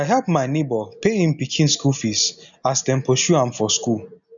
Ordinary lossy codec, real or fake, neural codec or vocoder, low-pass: none; real; none; 7.2 kHz